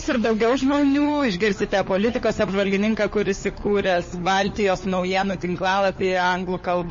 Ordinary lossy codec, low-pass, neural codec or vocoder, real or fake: MP3, 32 kbps; 7.2 kHz; codec, 16 kHz, 4 kbps, FunCodec, trained on LibriTTS, 50 frames a second; fake